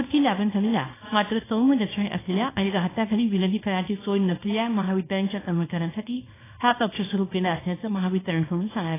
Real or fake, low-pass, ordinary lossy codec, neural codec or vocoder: fake; 3.6 kHz; AAC, 16 kbps; codec, 24 kHz, 0.9 kbps, WavTokenizer, small release